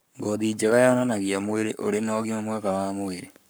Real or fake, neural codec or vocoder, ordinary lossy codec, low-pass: fake; codec, 44.1 kHz, 7.8 kbps, Pupu-Codec; none; none